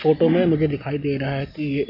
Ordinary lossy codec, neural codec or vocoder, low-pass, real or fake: none; none; 5.4 kHz; real